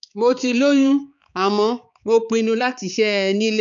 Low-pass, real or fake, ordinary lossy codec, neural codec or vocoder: 7.2 kHz; fake; none; codec, 16 kHz, 4 kbps, X-Codec, HuBERT features, trained on balanced general audio